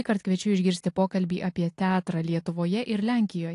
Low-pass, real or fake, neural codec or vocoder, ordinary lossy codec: 10.8 kHz; real; none; AAC, 48 kbps